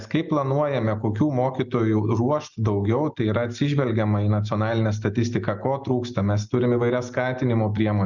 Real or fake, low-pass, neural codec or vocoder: real; 7.2 kHz; none